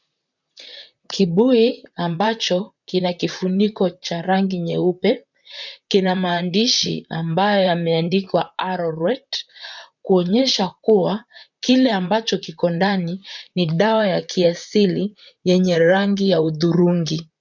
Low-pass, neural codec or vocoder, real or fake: 7.2 kHz; vocoder, 44.1 kHz, 128 mel bands, Pupu-Vocoder; fake